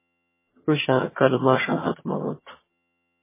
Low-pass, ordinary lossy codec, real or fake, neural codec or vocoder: 3.6 kHz; MP3, 16 kbps; fake; vocoder, 22.05 kHz, 80 mel bands, HiFi-GAN